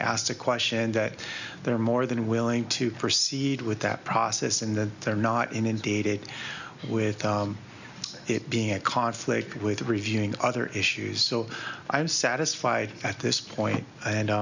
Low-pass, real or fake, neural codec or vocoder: 7.2 kHz; real; none